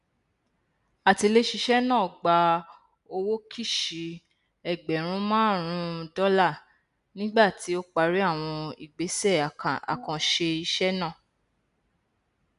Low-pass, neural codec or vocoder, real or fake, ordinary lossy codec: 10.8 kHz; none; real; none